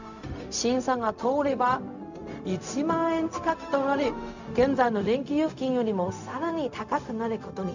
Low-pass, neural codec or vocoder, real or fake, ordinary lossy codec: 7.2 kHz; codec, 16 kHz, 0.4 kbps, LongCat-Audio-Codec; fake; none